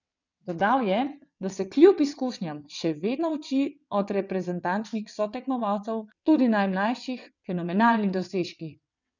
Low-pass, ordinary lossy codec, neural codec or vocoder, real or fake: 7.2 kHz; none; vocoder, 22.05 kHz, 80 mel bands, WaveNeXt; fake